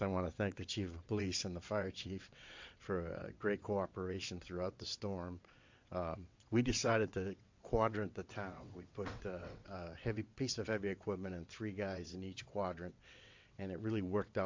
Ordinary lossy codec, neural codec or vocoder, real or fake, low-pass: MP3, 48 kbps; vocoder, 22.05 kHz, 80 mel bands, WaveNeXt; fake; 7.2 kHz